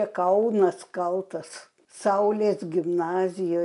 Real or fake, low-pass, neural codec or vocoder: real; 10.8 kHz; none